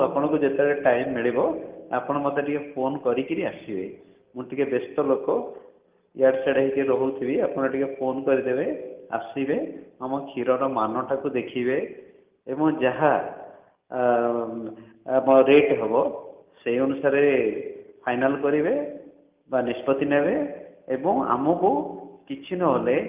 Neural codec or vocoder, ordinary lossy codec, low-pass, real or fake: none; Opus, 32 kbps; 3.6 kHz; real